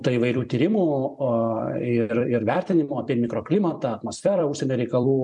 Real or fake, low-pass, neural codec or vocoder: fake; 10.8 kHz; vocoder, 44.1 kHz, 128 mel bands every 256 samples, BigVGAN v2